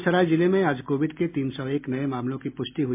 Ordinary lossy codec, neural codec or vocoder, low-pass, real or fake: MP3, 32 kbps; none; 3.6 kHz; real